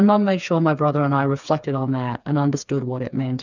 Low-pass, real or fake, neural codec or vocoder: 7.2 kHz; fake; codec, 16 kHz, 4 kbps, FreqCodec, smaller model